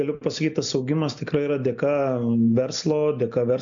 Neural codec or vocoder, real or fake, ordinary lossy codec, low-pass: none; real; MP3, 64 kbps; 7.2 kHz